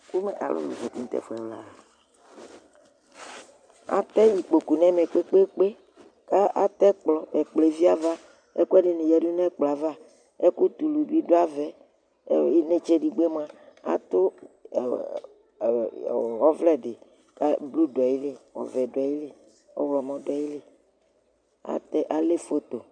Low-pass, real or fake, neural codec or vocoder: 9.9 kHz; real; none